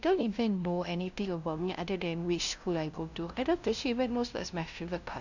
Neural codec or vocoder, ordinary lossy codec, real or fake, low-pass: codec, 16 kHz, 0.5 kbps, FunCodec, trained on LibriTTS, 25 frames a second; none; fake; 7.2 kHz